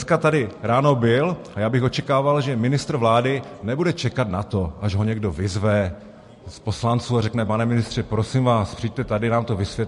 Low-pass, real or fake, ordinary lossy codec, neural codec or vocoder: 14.4 kHz; real; MP3, 48 kbps; none